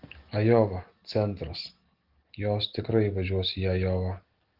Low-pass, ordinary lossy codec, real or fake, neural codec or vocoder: 5.4 kHz; Opus, 16 kbps; real; none